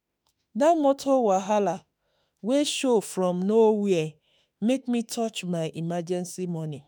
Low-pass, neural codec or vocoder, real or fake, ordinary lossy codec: none; autoencoder, 48 kHz, 32 numbers a frame, DAC-VAE, trained on Japanese speech; fake; none